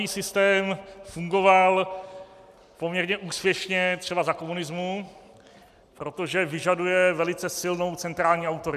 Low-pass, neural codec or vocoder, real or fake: 14.4 kHz; none; real